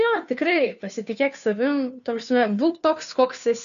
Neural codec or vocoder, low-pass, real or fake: codec, 16 kHz, 2 kbps, FunCodec, trained on Chinese and English, 25 frames a second; 7.2 kHz; fake